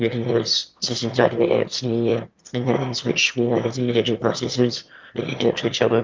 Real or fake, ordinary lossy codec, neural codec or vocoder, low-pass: fake; Opus, 24 kbps; autoencoder, 22.05 kHz, a latent of 192 numbers a frame, VITS, trained on one speaker; 7.2 kHz